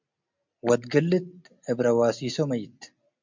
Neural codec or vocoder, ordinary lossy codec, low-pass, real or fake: none; MP3, 64 kbps; 7.2 kHz; real